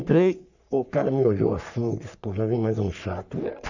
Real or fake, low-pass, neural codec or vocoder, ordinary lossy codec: fake; 7.2 kHz; codec, 44.1 kHz, 3.4 kbps, Pupu-Codec; none